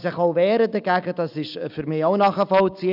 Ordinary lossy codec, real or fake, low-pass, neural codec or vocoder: none; real; 5.4 kHz; none